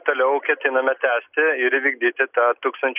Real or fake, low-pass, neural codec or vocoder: real; 3.6 kHz; none